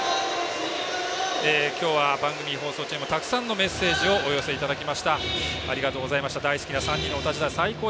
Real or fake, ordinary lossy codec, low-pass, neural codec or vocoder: real; none; none; none